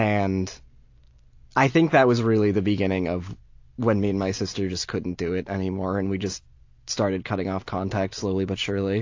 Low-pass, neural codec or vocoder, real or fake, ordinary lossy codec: 7.2 kHz; none; real; AAC, 48 kbps